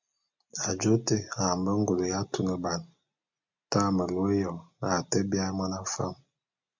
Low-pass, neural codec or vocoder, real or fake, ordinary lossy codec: 7.2 kHz; none; real; MP3, 64 kbps